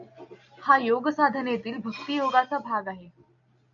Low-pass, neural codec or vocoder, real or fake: 7.2 kHz; none; real